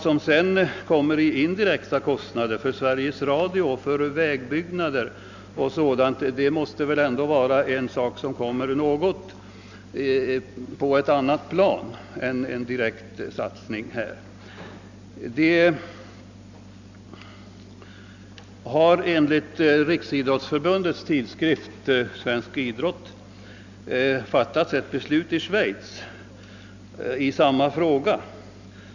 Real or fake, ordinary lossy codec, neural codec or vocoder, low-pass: real; none; none; 7.2 kHz